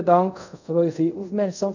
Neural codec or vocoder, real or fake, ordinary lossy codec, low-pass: codec, 24 kHz, 0.5 kbps, DualCodec; fake; none; 7.2 kHz